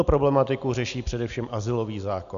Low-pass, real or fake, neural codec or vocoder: 7.2 kHz; real; none